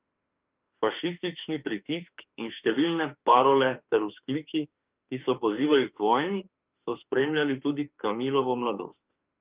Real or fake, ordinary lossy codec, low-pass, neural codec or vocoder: fake; Opus, 24 kbps; 3.6 kHz; autoencoder, 48 kHz, 32 numbers a frame, DAC-VAE, trained on Japanese speech